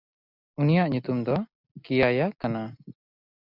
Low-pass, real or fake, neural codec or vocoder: 5.4 kHz; real; none